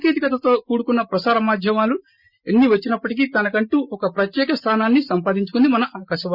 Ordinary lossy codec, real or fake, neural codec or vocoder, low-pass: Opus, 64 kbps; real; none; 5.4 kHz